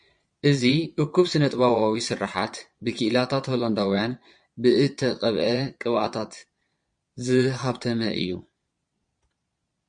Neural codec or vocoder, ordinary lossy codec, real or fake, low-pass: vocoder, 22.05 kHz, 80 mel bands, Vocos; MP3, 48 kbps; fake; 9.9 kHz